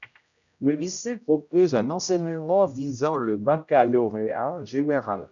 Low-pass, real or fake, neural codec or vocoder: 7.2 kHz; fake; codec, 16 kHz, 0.5 kbps, X-Codec, HuBERT features, trained on general audio